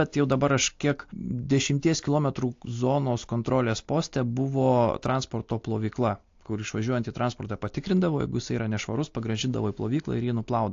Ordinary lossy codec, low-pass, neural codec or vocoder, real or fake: AAC, 48 kbps; 7.2 kHz; none; real